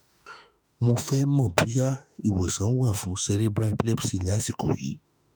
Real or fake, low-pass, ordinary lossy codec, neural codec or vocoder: fake; none; none; autoencoder, 48 kHz, 32 numbers a frame, DAC-VAE, trained on Japanese speech